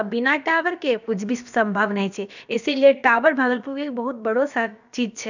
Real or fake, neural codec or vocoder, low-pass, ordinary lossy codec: fake; codec, 16 kHz, about 1 kbps, DyCAST, with the encoder's durations; 7.2 kHz; none